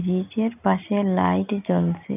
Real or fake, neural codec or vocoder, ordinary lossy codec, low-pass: real; none; none; 3.6 kHz